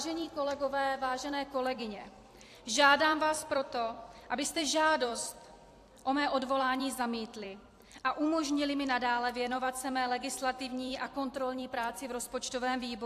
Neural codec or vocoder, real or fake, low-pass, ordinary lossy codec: vocoder, 44.1 kHz, 128 mel bands every 256 samples, BigVGAN v2; fake; 14.4 kHz; AAC, 64 kbps